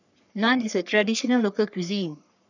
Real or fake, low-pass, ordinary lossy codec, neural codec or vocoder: fake; 7.2 kHz; none; codec, 44.1 kHz, 3.4 kbps, Pupu-Codec